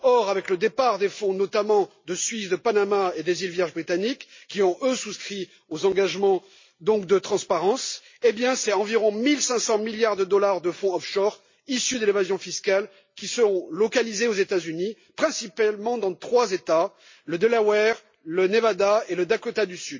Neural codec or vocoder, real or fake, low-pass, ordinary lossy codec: none; real; 7.2 kHz; MP3, 32 kbps